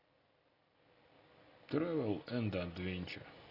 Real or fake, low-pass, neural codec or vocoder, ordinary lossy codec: real; 5.4 kHz; none; AAC, 24 kbps